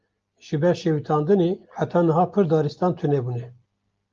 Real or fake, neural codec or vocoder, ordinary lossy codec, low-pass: real; none; Opus, 32 kbps; 7.2 kHz